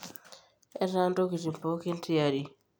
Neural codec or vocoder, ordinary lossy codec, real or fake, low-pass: none; none; real; none